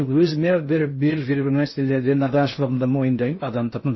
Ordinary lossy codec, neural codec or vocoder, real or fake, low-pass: MP3, 24 kbps; codec, 16 kHz in and 24 kHz out, 0.6 kbps, FocalCodec, streaming, 4096 codes; fake; 7.2 kHz